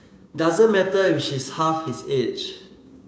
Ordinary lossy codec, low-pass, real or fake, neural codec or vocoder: none; none; fake; codec, 16 kHz, 6 kbps, DAC